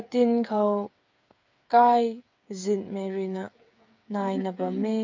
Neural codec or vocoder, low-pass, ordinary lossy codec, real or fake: codec, 16 kHz, 8 kbps, FreqCodec, smaller model; 7.2 kHz; MP3, 64 kbps; fake